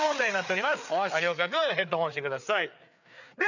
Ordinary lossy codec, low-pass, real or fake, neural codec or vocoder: none; 7.2 kHz; fake; codec, 16 kHz, 4 kbps, FreqCodec, larger model